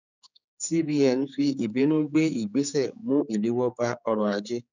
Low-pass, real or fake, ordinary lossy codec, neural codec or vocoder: 7.2 kHz; fake; none; codec, 16 kHz, 4 kbps, X-Codec, HuBERT features, trained on general audio